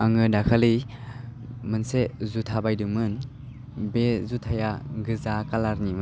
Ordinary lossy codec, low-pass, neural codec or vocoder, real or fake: none; none; none; real